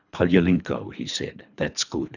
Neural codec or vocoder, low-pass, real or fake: codec, 24 kHz, 3 kbps, HILCodec; 7.2 kHz; fake